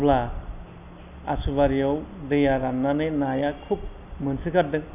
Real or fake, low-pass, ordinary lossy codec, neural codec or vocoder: real; 3.6 kHz; none; none